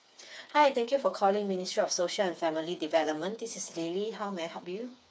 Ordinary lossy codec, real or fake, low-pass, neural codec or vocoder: none; fake; none; codec, 16 kHz, 4 kbps, FreqCodec, smaller model